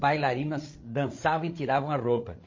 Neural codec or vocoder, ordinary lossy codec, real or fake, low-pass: codec, 16 kHz, 8 kbps, FreqCodec, larger model; MP3, 32 kbps; fake; 7.2 kHz